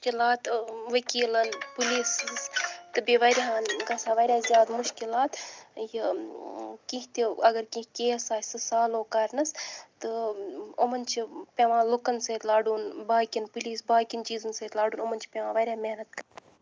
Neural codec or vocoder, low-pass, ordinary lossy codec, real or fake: none; none; none; real